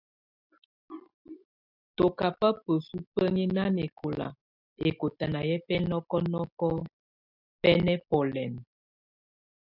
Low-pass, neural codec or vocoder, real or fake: 5.4 kHz; none; real